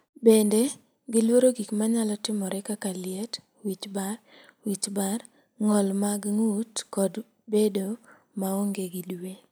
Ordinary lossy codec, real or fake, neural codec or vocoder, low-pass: none; real; none; none